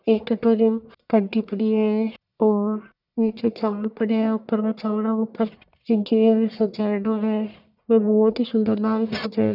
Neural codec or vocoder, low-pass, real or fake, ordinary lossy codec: codec, 44.1 kHz, 1.7 kbps, Pupu-Codec; 5.4 kHz; fake; none